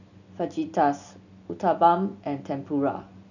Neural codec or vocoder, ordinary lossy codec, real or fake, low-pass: none; none; real; 7.2 kHz